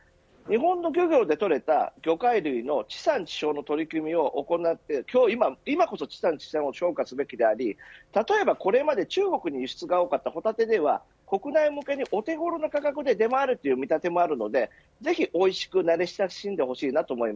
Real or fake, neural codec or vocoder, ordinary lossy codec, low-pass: real; none; none; none